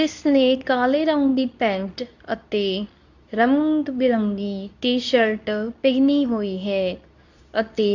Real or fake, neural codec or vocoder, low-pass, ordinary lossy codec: fake; codec, 24 kHz, 0.9 kbps, WavTokenizer, medium speech release version 2; 7.2 kHz; none